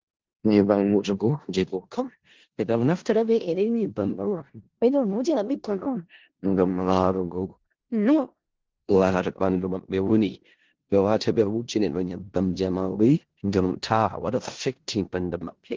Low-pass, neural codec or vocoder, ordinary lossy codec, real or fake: 7.2 kHz; codec, 16 kHz in and 24 kHz out, 0.4 kbps, LongCat-Audio-Codec, four codebook decoder; Opus, 16 kbps; fake